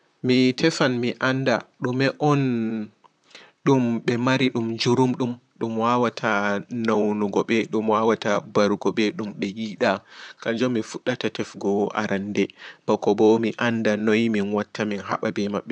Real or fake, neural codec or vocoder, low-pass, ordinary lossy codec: fake; vocoder, 44.1 kHz, 128 mel bands, Pupu-Vocoder; 9.9 kHz; none